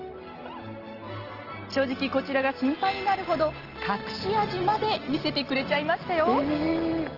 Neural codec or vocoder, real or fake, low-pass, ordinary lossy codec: none; real; 5.4 kHz; Opus, 16 kbps